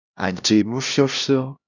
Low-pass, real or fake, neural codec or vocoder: 7.2 kHz; fake; codec, 16 kHz, 1 kbps, X-Codec, HuBERT features, trained on LibriSpeech